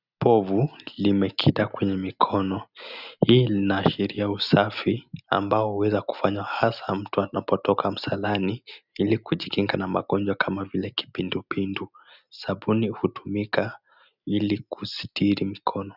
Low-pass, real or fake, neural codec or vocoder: 5.4 kHz; real; none